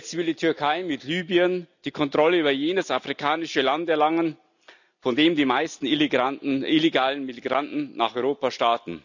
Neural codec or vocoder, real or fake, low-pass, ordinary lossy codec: none; real; 7.2 kHz; none